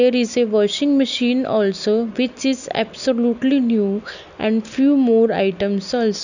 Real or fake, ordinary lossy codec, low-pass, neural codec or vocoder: real; none; 7.2 kHz; none